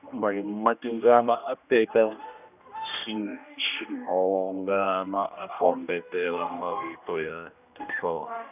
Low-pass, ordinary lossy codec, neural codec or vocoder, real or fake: 3.6 kHz; none; codec, 16 kHz, 1 kbps, X-Codec, HuBERT features, trained on general audio; fake